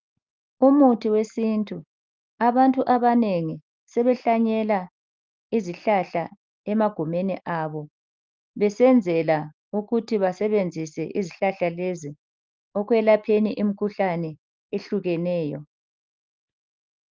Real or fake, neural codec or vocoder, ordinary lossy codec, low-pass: real; none; Opus, 24 kbps; 7.2 kHz